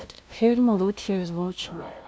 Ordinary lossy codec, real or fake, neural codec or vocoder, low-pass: none; fake; codec, 16 kHz, 0.5 kbps, FunCodec, trained on LibriTTS, 25 frames a second; none